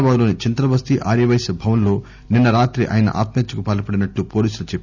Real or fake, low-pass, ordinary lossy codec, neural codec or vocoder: real; 7.2 kHz; none; none